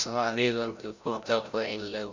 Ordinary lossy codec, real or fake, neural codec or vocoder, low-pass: Opus, 64 kbps; fake; codec, 16 kHz, 0.5 kbps, FreqCodec, larger model; 7.2 kHz